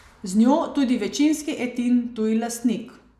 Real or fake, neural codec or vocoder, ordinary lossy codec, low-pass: real; none; none; 14.4 kHz